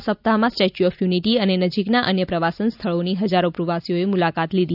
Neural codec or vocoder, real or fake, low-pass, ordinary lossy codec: none; real; 5.4 kHz; none